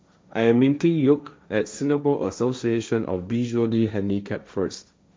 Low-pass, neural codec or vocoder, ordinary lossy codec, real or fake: none; codec, 16 kHz, 1.1 kbps, Voila-Tokenizer; none; fake